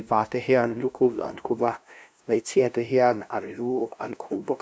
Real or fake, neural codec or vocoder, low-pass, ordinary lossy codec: fake; codec, 16 kHz, 0.5 kbps, FunCodec, trained on LibriTTS, 25 frames a second; none; none